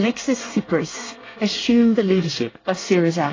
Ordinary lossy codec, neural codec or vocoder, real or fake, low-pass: AAC, 32 kbps; codec, 24 kHz, 1 kbps, SNAC; fake; 7.2 kHz